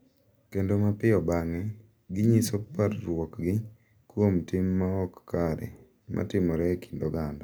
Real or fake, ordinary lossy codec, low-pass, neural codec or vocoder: real; none; none; none